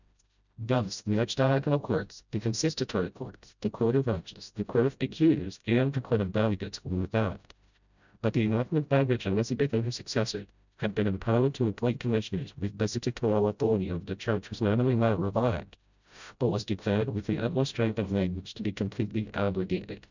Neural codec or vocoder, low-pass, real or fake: codec, 16 kHz, 0.5 kbps, FreqCodec, smaller model; 7.2 kHz; fake